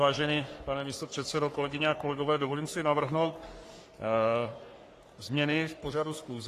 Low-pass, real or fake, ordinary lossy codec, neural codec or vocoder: 14.4 kHz; fake; AAC, 48 kbps; codec, 44.1 kHz, 3.4 kbps, Pupu-Codec